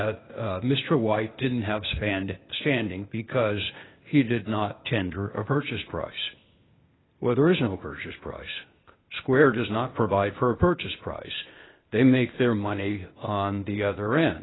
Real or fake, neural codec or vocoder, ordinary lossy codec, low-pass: fake; codec, 16 kHz, 0.8 kbps, ZipCodec; AAC, 16 kbps; 7.2 kHz